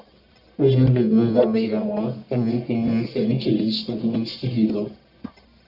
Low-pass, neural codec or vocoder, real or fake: 5.4 kHz; codec, 44.1 kHz, 1.7 kbps, Pupu-Codec; fake